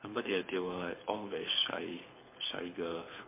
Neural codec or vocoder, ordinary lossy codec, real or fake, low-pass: codec, 24 kHz, 6 kbps, HILCodec; MP3, 24 kbps; fake; 3.6 kHz